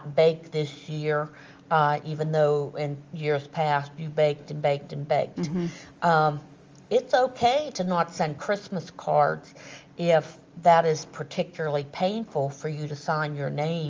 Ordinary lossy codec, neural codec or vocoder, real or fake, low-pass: Opus, 32 kbps; none; real; 7.2 kHz